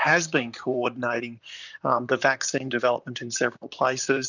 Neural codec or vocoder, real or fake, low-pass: vocoder, 44.1 kHz, 128 mel bands, Pupu-Vocoder; fake; 7.2 kHz